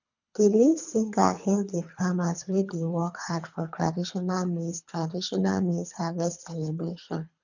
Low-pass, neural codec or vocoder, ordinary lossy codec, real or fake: 7.2 kHz; codec, 24 kHz, 3 kbps, HILCodec; none; fake